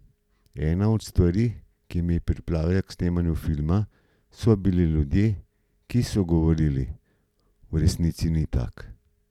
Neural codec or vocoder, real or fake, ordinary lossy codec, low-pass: none; real; none; 19.8 kHz